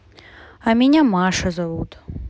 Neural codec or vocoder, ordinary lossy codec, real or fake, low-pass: none; none; real; none